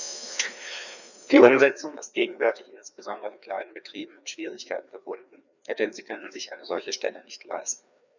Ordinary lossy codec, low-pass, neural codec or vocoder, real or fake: none; 7.2 kHz; codec, 16 kHz, 2 kbps, FreqCodec, larger model; fake